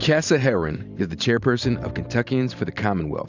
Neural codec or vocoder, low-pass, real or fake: vocoder, 44.1 kHz, 128 mel bands every 512 samples, BigVGAN v2; 7.2 kHz; fake